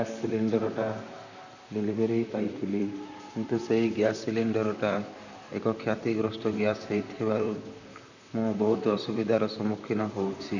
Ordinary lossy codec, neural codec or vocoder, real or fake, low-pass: none; vocoder, 44.1 kHz, 128 mel bands, Pupu-Vocoder; fake; 7.2 kHz